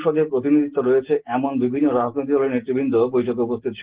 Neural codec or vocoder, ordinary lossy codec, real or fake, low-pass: none; Opus, 16 kbps; real; 3.6 kHz